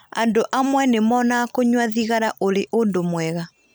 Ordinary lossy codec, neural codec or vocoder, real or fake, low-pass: none; none; real; none